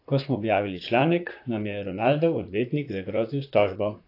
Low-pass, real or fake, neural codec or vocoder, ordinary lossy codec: 5.4 kHz; fake; vocoder, 44.1 kHz, 128 mel bands, Pupu-Vocoder; AAC, 32 kbps